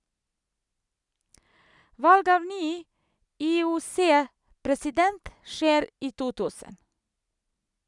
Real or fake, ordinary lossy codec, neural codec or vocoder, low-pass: real; none; none; 10.8 kHz